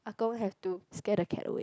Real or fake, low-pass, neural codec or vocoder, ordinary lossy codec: real; none; none; none